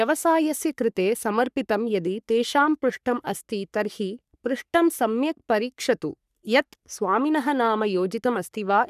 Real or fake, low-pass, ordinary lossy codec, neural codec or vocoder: fake; 14.4 kHz; none; codec, 44.1 kHz, 3.4 kbps, Pupu-Codec